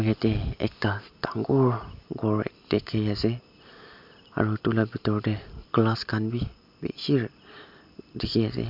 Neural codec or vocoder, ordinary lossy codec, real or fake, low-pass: none; MP3, 48 kbps; real; 5.4 kHz